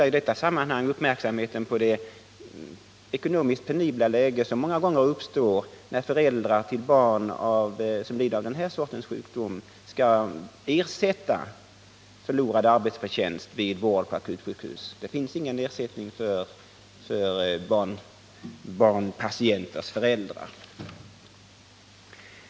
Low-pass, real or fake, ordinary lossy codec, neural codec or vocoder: none; real; none; none